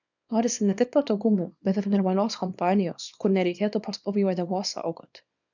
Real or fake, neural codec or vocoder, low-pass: fake; codec, 24 kHz, 0.9 kbps, WavTokenizer, small release; 7.2 kHz